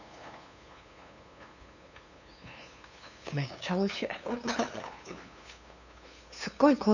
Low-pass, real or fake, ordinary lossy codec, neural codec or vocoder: 7.2 kHz; fake; none; codec, 16 kHz, 2 kbps, FunCodec, trained on LibriTTS, 25 frames a second